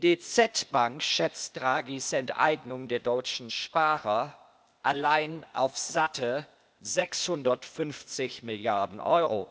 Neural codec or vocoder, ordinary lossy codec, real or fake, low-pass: codec, 16 kHz, 0.8 kbps, ZipCodec; none; fake; none